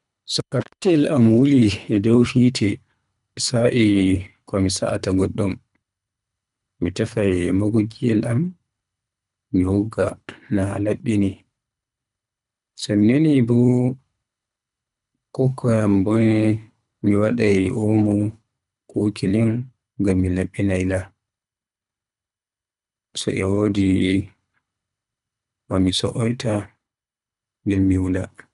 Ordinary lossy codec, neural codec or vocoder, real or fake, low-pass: none; codec, 24 kHz, 3 kbps, HILCodec; fake; 10.8 kHz